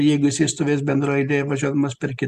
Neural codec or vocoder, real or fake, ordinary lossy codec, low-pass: none; real; Opus, 64 kbps; 14.4 kHz